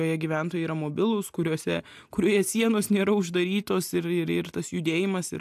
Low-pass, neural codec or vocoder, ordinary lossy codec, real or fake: 14.4 kHz; none; AAC, 96 kbps; real